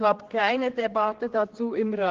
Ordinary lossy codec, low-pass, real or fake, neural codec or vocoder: Opus, 16 kbps; 7.2 kHz; fake; codec, 16 kHz, 4 kbps, X-Codec, HuBERT features, trained on general audio